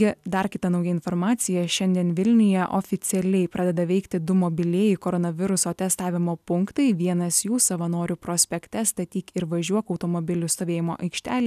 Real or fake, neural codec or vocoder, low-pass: real; none; 14.4 kHz